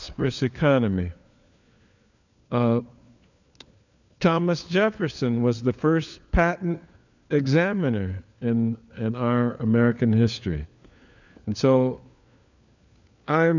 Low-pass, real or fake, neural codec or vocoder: 7.2 kHz; fake; codec, 16 kHz, 4 kbps, FunCodec, trained on LibriTTS, 50 frames a second